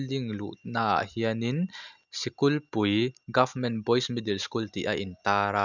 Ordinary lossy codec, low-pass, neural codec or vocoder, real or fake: none; 7.2 kHz; none; real